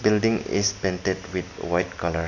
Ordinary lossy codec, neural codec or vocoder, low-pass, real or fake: none; none; 7.2 kHz; real